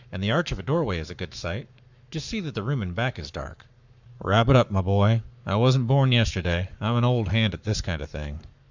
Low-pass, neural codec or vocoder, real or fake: 7.2 kHz; codec, 44.1 kHz, 7.8 kbps, Pupu-Codec; fake